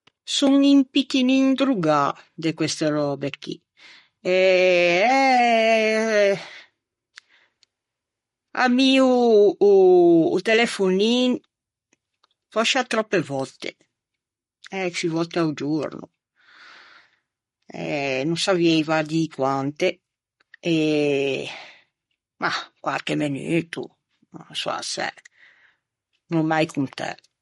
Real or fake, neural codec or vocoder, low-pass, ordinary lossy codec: fake; codec, 44.1 kHz, 7.8 kbps, Pupu-Codec; 19.8 kHz; MP3, 48 kbps